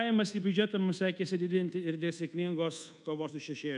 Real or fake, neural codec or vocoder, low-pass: fake; codec, 24 kHz, 1.2 kbps, DualCodec; 10.8 kHz